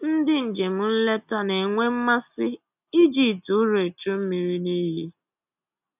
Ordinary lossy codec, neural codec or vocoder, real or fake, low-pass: none; none; real; 3.6 kHz